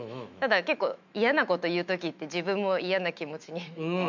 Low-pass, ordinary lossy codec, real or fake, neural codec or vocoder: 7.2 kHz; MP3, 64 kbps; fake; autoencoder, 48 kHz, 128 numbers a frame, DAC-VAE, trained on Japanese speech